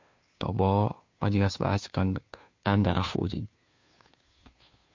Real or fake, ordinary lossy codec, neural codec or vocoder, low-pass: fake; MP3, 48 kbps; codec, 16 kHz, 2 kbps, FunCodec, trained on Chinese and English, 25 frames a second; 7.2 kHz